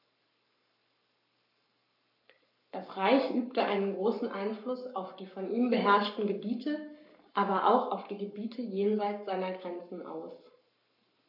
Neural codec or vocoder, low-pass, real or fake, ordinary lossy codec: codec, 44.1 kHz, 7.8 kbps, Pupu-Codec; 5.4 kHz; fake; none